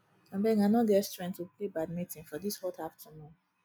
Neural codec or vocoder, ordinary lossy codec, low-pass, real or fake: none; none; none; real